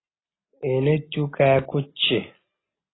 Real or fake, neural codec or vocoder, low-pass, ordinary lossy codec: real; none; 7.2 kHz; AAC, 16 kbps